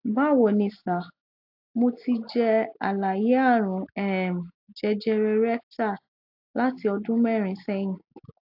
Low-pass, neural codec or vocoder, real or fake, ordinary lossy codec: 5.4 kHz; none; real; AAC, 48 kbps